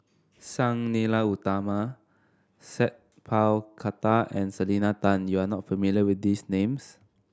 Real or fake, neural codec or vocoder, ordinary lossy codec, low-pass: real; none; none; none